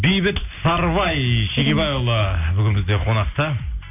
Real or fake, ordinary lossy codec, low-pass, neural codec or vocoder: real; none; 3.6 kHz; none